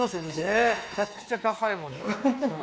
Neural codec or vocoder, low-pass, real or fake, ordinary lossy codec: codec, 16 kHz, 2 kbps, X-Codec, WavLM features, trained on Multilingual LibriSpeech; none; fake; none